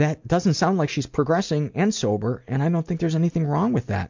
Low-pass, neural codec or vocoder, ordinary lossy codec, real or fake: 7.2 kHz; none; MP3, 64 kbps; real